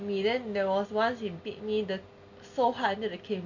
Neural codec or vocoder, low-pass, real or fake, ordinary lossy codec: none; 7.2 kHz; real; none